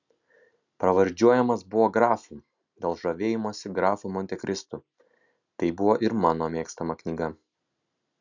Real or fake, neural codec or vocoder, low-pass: real; none; 7.2 kHz